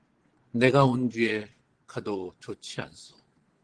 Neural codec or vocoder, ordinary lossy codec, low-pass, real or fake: vocoder, 22.05 kHz, 80 mel bands, Vocos; Opus, 16 kbps; 9.9 kHz; fake